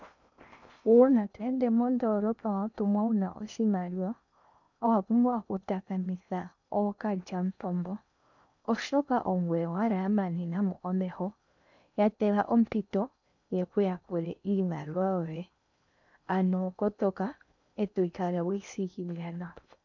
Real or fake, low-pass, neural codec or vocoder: fake; 7.2 kHz; codec, 16 kHz in and 24 kHz out, 0.8 kbps, FocalCodec, streaming, 65536 codes